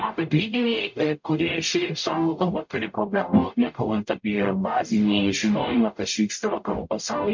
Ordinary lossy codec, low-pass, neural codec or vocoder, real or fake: MP3, 48 kbps; 7.2 kHz; codec, 44.1 kHz, 0.9 kbps, DAC; fake